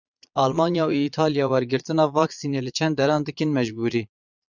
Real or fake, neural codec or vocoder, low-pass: fake; vocoder, 22.05 kHz, 80 mel bands, Vocos; 7.2 kHz